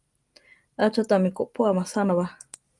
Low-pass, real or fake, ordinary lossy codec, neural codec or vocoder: 10.8 kHz; real; Opus, 32 kbps; none